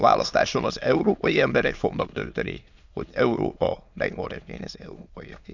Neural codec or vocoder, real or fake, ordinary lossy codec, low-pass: autoencoder, 22.05 kHz, a latent of 192 numbers a frame, VITS, trained on many speakers; fake; none; 7.2 kHz